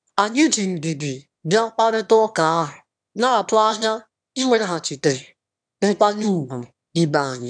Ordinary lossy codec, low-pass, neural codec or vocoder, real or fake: none; 9.9 kHz; autoencoder, 22.05 kHz, a latent of 192 numbers a frame, VITS, trained on one speaker; fake